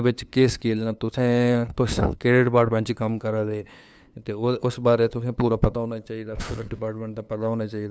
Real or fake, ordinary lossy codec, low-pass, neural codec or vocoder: fake; none; none; codec, 16 kHz, 2 kbps, FunCodec, trained on LibriTTS, 25 frames a second